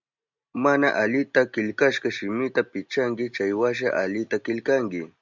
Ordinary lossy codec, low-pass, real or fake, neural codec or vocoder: Opus, 64 kbps; 7.2 kHz; real; none